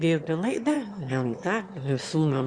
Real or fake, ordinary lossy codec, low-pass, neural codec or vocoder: fake; Opus, 64 kbps; 9.9 kHz; autoencoder, 22.05 kHz, a latent of 192 numbers a frame, VITS, trained on one speaker